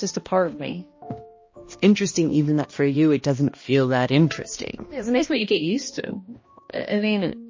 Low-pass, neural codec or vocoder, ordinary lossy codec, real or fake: 7.2 kHz; codec, 16 kHz, 1 kbps, X-Codec, HuBERT features, trained on balanced general audio; MP3, 32 kbps; fake